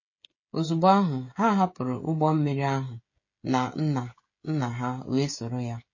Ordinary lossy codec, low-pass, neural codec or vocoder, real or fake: MP3, 32 kbps; 7.2 kHz; codec, 16 kHz, 16 kbps, FreqCodec, smaller model; fake